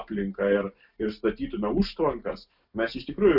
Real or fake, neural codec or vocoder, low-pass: real; none; 5.4 kHz